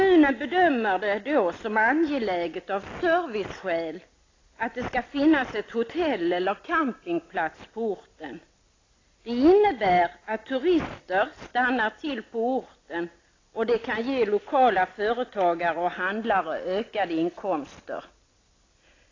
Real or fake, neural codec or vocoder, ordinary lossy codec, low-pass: real; none; AAC, 32 kbps; 7.2 kHz